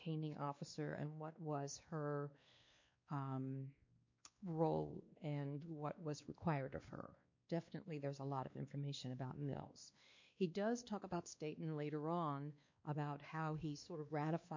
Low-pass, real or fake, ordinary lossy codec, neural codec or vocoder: 7.2 kHz; fake; MP3, 48 kbps; codec, 16 kHz, 2 kbps, X-Codec, WavLM features, trained on Multilingual LibriSpeech